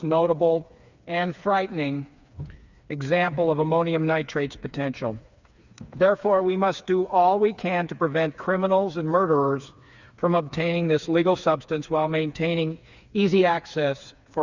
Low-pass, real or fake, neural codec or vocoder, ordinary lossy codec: 7.2 kHz; fake; codec, 16 kHz, 4 kbps, FreqCodec, smaller model; Opus, 64 kbps